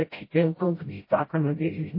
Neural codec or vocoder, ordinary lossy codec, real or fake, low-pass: codec, 16 kHz, 0.5 kbps, FreqCodec, smaller model; MP3, 24 kbps; fake; 5.4 kHz